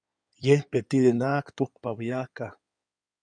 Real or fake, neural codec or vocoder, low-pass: fake; codec, 16 kHz in and 24 kHz out, 2.2 kbps, FireRedTTS-2 codec; 9.9 kHz